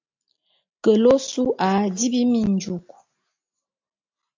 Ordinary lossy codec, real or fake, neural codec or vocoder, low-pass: AAC, 48 kbps; real; none; 7.2 kHz